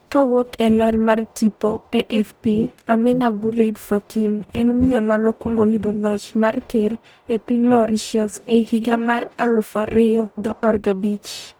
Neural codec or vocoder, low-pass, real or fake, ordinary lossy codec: codec, 44.1 kHz, 0.9 kbps, DAC; none; fake; none